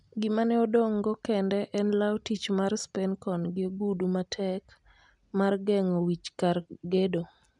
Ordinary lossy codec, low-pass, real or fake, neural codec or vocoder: none; 10.8 kHz; real; none